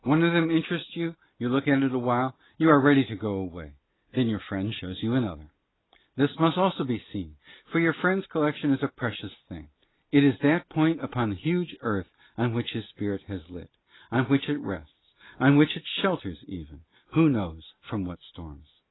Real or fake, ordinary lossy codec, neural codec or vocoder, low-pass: real; AAC, 16 kbps; none; 7.2 kHz